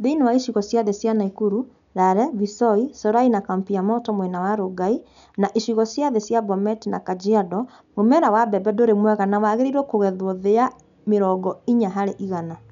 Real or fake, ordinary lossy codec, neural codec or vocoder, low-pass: real; none; none; 7.2 kHz